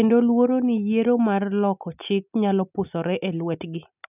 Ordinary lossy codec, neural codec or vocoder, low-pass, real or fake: none; none; 3.6 kHz; real